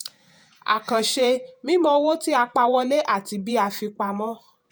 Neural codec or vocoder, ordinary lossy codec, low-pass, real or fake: vocoder, 48 kHz, 128 mel bands, Vocos; none; none; fake